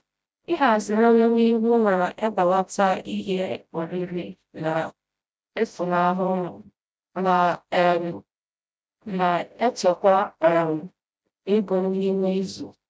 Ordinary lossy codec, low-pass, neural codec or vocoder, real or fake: none; none; codec, 16 kHz, 0.5 kbps, FreqCodec, smaller model; fake